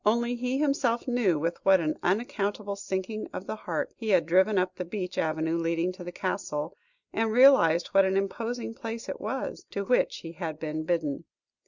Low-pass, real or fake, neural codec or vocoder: 7.2 kHz; real; none